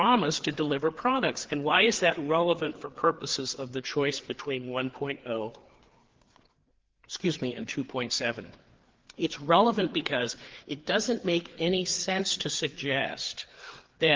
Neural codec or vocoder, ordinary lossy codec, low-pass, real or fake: codec, 16 kHz, 4 kbps, FreqCodec, larger model; Opus, 16 kbps; 7.2 kHz; fake